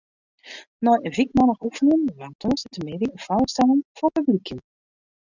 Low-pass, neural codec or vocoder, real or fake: 7.2 kHz; none; real